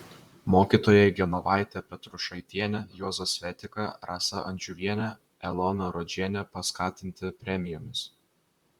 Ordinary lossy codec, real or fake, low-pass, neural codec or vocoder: Opus, 64 kbps; fake; 19.8 kHz; vocoder, 44.1 kHz, 128 mel bands, Pupu-Vocoder